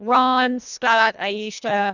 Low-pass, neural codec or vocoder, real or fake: 7.2 kHz; codec, 24 kHz, 1.5 kbps, HILCodec; fake